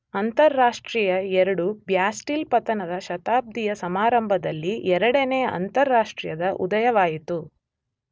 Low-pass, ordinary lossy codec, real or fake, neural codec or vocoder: none; none; real; none